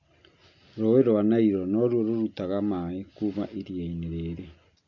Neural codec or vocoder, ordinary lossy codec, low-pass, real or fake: none; MP3, 48 kbps; 7.2 kHz; real